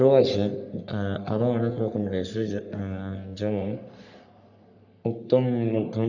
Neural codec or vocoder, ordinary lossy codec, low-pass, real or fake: codec, 44.1 kHz, 3.4 kbps, Pupu-Codec; none; 7.2 kHz; fake